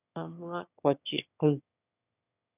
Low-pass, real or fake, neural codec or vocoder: 3.6 kHz; fake; autoencoder, 22.05 kHz, a latent of 192 numbers a frame, VITS, trained on one speaker